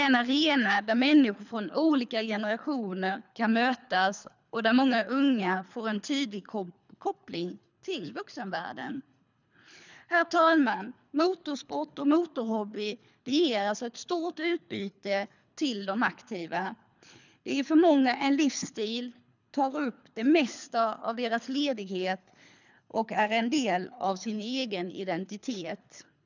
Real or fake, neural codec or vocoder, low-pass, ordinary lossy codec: fake; codec, 24 kHz, 3 kbps, HILCodec; 7.2 kHz; none